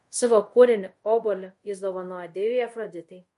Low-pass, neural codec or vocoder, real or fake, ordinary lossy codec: 10.8 kHz; codec, 24 kHz, 0.5 kbps, DualCodec; fake; MP3, 48 kbps